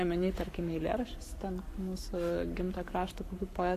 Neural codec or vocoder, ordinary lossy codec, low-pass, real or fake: codec, 44.1 kHz, 7.8 kbps, Pupu-Codec; AAC, 64 kbps; 14.4 kHz; fake